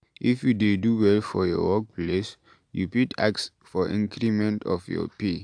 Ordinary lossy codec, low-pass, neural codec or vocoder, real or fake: AAC, 64 kbps; 9.9 kHz; none; real